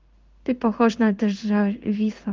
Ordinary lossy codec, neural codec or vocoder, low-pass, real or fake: Opus, 32 kbps; codec, 16 kHz in and 24 kHz out, 1 kbps, XY-Tokenizer; 7.2 kHz; fake